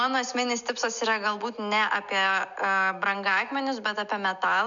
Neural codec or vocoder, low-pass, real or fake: none; 7.2 kHz; real